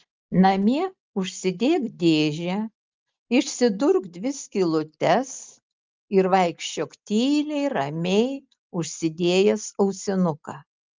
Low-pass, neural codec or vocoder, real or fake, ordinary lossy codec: 7.2 kHz; none; real; Opus, 24 kbps